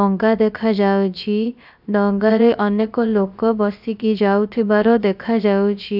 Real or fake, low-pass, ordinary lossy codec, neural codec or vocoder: fake; 5.4 kHz; none; codec, 16 kHz, about 1 kbps, DyCAST, with the encoder's durations